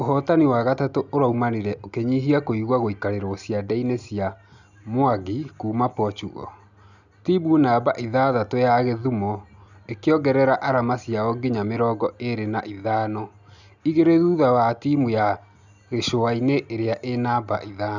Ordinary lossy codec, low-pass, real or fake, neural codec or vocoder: none; 7.2 kHz; real; none